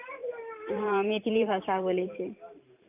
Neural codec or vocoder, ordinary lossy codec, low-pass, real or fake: vocoder, 44.1 kHz, 128 mel bands every 512 samples, BigVGAN v2; AAC, 32 kbps; 3.6 kHz; fake